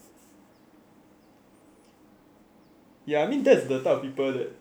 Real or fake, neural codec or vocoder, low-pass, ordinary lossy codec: real; none; none; none